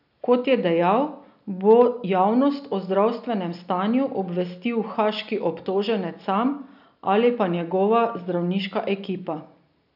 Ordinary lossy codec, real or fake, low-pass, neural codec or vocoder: none; real; 5.4 kHz; none